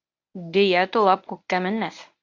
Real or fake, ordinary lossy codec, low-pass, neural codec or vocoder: fake; AAC, 48 kbps; 7.2 kHz; codec, 24 kHz, 0.9 kbps, WavTokenizer, medium speech release version 1